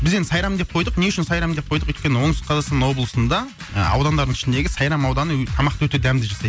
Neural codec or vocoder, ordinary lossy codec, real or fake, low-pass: none; none; real; none